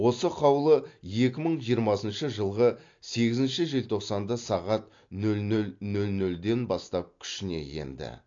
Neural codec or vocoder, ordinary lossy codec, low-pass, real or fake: none; AAC, 48 kbps; 7.2 kHz; real